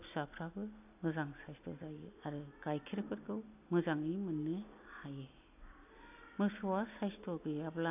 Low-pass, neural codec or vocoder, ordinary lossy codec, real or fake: 3.6 kHz; none; AAC, 32 kbps; real